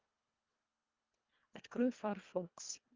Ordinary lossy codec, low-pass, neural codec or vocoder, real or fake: Opus, 24 kbps; 7.2 kHz; codec, 24 kHz, 1.5 kbps, HILCodec; fake